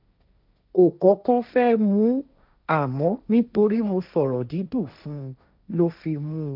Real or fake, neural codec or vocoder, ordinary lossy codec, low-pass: fake; codec, 16 kHz, 1.1 kbps, Voila-Tokenizer; none; 5.4 kHz